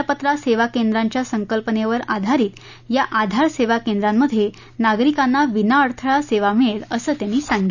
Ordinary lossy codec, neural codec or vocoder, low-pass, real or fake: none; none; 7.2 kHz; real